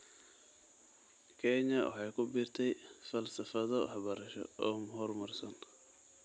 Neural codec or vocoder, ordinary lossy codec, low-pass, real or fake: none; none; 9.9 kHz; real